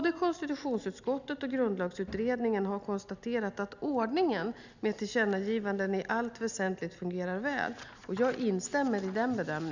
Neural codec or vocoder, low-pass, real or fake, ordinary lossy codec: none; 7.2 kHz; real; none